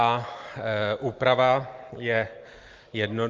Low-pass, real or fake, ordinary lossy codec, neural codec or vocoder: 7.2 kHz; real; Opus, 32 kbps; none